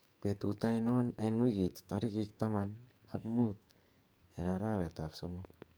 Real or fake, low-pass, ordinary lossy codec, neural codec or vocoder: fake; none; none; codec, 44.1 kHz, 2.6 kbps, SNAC